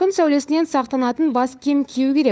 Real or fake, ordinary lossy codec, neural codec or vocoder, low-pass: fake; none; codec, 16 kHz, 4 kbps, FunCodec, trained on LibriTTS, 50 frames a second; none